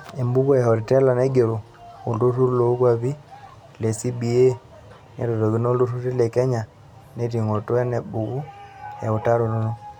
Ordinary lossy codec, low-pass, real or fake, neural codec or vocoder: none; 19.8 kHz; real; none